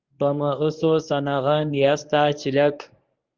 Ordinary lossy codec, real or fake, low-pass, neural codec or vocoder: Opus, 32 kbps; fake; 7.2 kHz; codec, 24 kHz, 0.9 kbps, WavTokenizer, medium speech release version 1